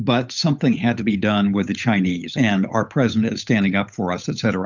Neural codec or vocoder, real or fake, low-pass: codec, 16 kHz, 8 kbps, FunCodec, trained on Chinese and English, 25 frames a second; fake; 7.2 kHz